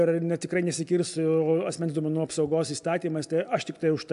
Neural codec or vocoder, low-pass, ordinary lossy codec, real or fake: none; 10.8 kHz; AAC, 96 kbps; real